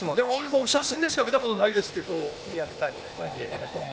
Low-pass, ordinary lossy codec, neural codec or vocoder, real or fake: none; none; codec, 16 kHz, 0.8 kbps, ZipCodec; fake